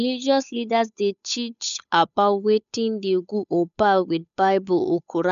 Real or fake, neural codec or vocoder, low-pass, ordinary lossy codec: fake; codec, 16 kHz, 8 kbps, FunCodec, trained on Chinese and English, 25 frames a second; 7.2 kHz; none